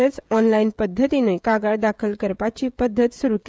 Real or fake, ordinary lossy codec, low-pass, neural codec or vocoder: fake; none; none; codec, 16 kHz, 16 kbps, FreqCodec, smaller model